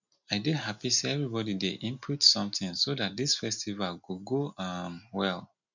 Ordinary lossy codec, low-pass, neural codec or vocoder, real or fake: none; 7.2 kHz; none; real